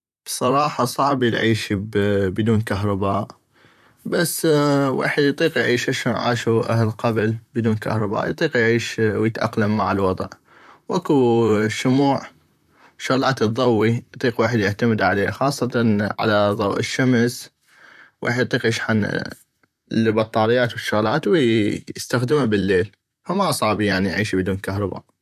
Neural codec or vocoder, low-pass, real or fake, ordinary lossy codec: vocoder, 44.1 kHz, 128 mel bands, Pupu-Vocoder; 14.4 kHz; fake; none